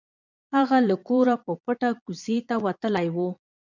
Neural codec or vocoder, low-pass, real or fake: vocoder, 22.05 kHz, 80 mel bands, Vocos; 7.2 kHz; fake